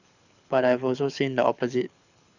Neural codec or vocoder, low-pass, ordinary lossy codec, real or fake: codec, 24 kHz, 6 kbps, HILCodec; 7.2 kHz; none; fake